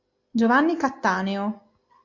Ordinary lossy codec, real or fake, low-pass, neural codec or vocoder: AAC, 48 kbps; real; 7.2 kHz; none